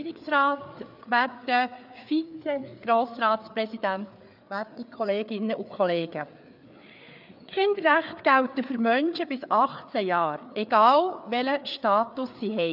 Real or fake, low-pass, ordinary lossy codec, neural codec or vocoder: fake; 5.4 kHz; none; codec, 16 kHz, 4 kbps, FreqCodec, larger model